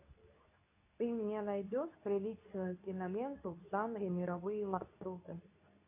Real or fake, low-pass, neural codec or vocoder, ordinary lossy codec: fake; 3.6 kHz; codec, 24 kHz, 0.9 kbps, WavTokenizer, medium speech release version 2; AAC, 32 kbps